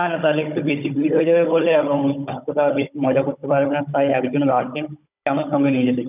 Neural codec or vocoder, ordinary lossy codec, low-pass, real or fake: codec, 16 kHz, 16 kbps, FunCodec, trained on Chinese and English, 50 frames a second; none; 3.6 kHz; fake